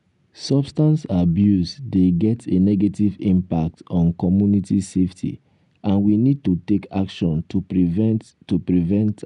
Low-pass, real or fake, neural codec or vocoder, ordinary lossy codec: 10.8 kHz; real; none; none